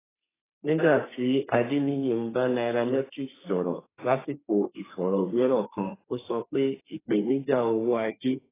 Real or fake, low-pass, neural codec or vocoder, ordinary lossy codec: fake; 3.6 kHz; codec, 32 kHz, 1.9 kbps, SNAC; AAC, 16 kbps